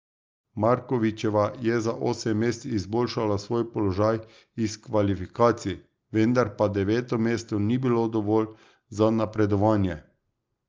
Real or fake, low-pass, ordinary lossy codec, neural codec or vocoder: real; 7.2 kHz; Opus, 24 kbps; none